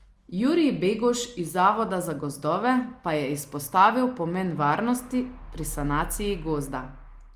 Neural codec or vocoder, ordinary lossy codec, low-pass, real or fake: none; Opus, 32 kbps; 14.4 kHz; real